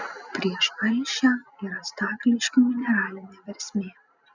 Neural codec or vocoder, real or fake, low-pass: none; real; 7.2 kHz